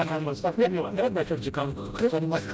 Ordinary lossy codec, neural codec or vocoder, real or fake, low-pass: none; codec, 16 kHz, 0.5 kbps, FreqCodec, smaller model; fake; none